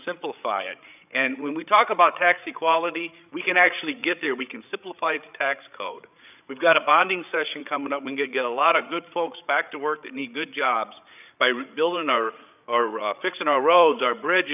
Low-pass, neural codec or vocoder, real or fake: 3.6 kHz; codec, 16 kHz, 8 kbps, FreqCodec, larger model; fake